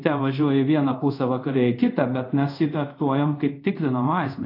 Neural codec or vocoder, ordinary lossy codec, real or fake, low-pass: codec, 24 kHz, 0.5 kbps, DualCodec; AAC, 32 kbps; fake; 5.4 kHz